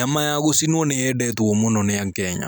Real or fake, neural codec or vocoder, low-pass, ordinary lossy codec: real; none; none; none